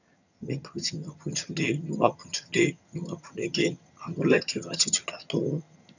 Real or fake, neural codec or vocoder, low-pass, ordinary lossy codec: fake; vocoder, 22.05 kHz, 80 mel bands, HiFi-GAN; 7.2 kHz; none